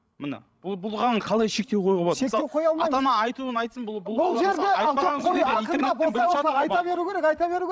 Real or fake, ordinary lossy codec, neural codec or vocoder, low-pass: fake; none; codec, 16 kHz, 16 kbps, FreqCodec, larger model; none